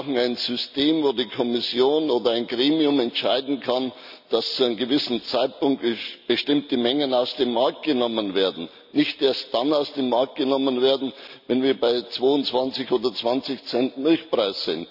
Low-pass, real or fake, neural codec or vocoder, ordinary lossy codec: 5.4 kHz; real; none; none